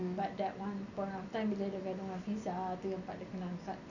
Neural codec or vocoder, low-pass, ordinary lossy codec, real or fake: none; 7.2 kHz; none; real